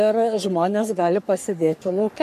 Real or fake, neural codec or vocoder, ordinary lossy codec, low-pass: fake; codec, 44.1 kHz, 3.4 kbps, Pupu-Codec; MP3, 64 kbps; 14.4 kHz